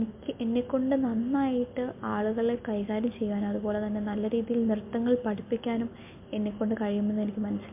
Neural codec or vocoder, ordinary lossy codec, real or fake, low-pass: none; MP3, 32 kbps; real; 3.6 kHz